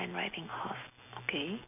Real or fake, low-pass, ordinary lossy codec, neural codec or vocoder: real; 3.6 kHz; none; none